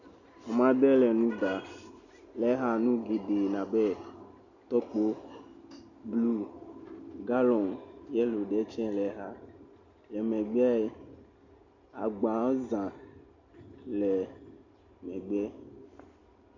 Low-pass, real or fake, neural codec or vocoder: 7.2 kHz; real; none